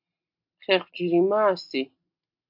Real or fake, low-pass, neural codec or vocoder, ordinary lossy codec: real; 5.4 kHz; none; MP3, 48 kbps